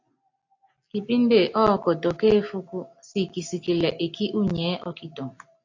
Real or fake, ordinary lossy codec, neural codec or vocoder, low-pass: real; AAC, 48 kbps; none; 7.2 kHz